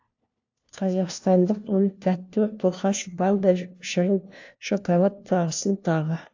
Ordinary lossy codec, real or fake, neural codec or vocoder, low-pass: AAC, 48 kbps; fake; codec, 16 kHz, 1 kbps, FunCodec, trained on LibriTTS, 50 frames a second; 7.2 kHz